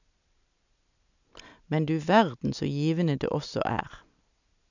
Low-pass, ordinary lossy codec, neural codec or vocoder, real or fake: 7.2 kHz; none; none; real